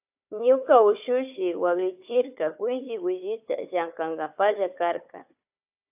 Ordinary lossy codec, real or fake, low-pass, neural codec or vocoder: AAC, 32 kbps; fake; 3.6 kHz; codec, 16 kHz, 4 kbps, FunCodec, trained on Chinese and English, 50 frames a second